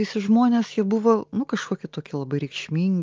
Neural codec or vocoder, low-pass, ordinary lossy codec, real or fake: none; 7.2 kHz; Opus, 24 kbps; real